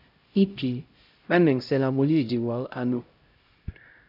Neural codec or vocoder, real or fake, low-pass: codec, 16 kHz, 0.5 kbps, X-Codec, HuBERT features, trained on LibriSpeech; fake; 5.4 kHz